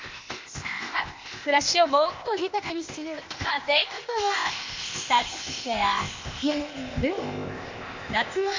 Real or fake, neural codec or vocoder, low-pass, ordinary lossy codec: fake; codec, 16 kHz, 0.8 kbps, ZipCodec; 7.2 kHz; MP3, 64 kbps